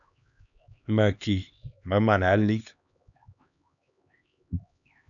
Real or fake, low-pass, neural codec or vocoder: fake; 7.2 kHz; codec, 16 kHz, 2 kbps, X-Codec, HuBERT features, trained on LibriSpeech